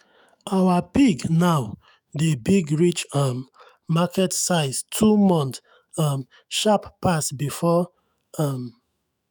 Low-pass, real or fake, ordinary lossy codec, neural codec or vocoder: none; fake; none; autoencoder, 48 kHz, 128 numbers a frame, DAC-VAE, trained on Japanese speech